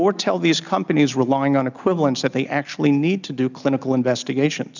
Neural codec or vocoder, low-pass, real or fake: none; 7.2 kHz; real